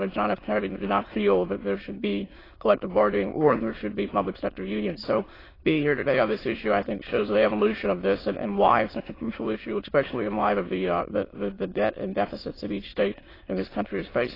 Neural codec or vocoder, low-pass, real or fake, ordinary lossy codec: autoencoder, 22.05 kHz, a latent of 192 numbers a frame, VITS, trained on many speakers; 5.4 kHz; fake; AAC, 24 kbps